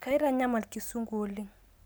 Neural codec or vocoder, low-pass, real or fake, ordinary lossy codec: none; none; real; none